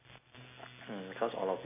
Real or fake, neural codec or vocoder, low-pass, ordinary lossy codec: real; none; 3.6 kHz; AAC, 24 kbps